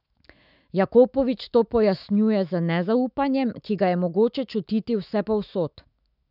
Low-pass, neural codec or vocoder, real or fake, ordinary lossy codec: 5.4 kHz; autoencoder, 48 kHz, 128 numbers a frame, DAC-VAE, trained on Japanese speech; fake; none